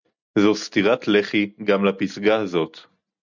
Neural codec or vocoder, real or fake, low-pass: none; real; 7.2 kHz